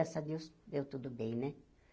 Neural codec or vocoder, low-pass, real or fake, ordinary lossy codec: none; none; real; none